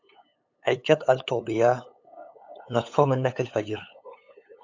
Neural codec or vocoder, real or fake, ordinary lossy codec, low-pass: codec, 16 kHz, 8 kbps, FunCodec, trained on LibriTTS, 25 frames a second; fake; AAC, 48 kbps; 7.2 kHz